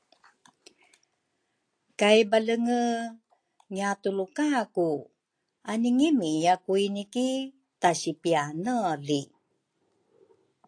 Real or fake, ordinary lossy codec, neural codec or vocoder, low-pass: real; AAC, 48 kbps; none; 9.9 kHz